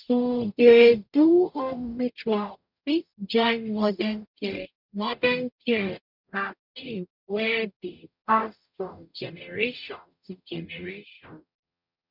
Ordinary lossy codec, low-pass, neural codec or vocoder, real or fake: none; 5.4 kHz; codec, 44.1 kHz, 0.9 kbps, DAC; fake